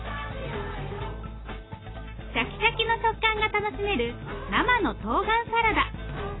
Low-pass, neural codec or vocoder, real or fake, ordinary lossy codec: 7.2 kHz; none; real; AAC, 16 kbps